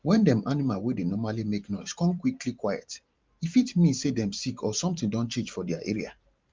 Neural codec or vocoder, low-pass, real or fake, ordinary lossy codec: vocoder, 44.1 kHz, 128 mel bands every 512 samples, BigVGAN v2; 7.2 kHz; fake; Opus, 32 kbps